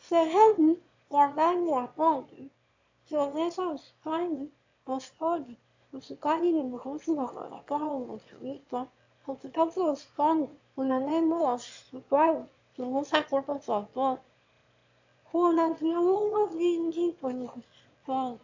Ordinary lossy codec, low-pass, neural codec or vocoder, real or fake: AAC, 48 kbps; 7.2 kHz; autoencoder, 22.05 kHz, a latent of 192 numbers a frame, VITS, trained on one speaker; fake